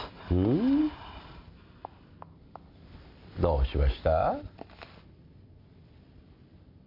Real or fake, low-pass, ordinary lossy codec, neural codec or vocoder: real; 5.4 kHz; MP3, 32 kbps; none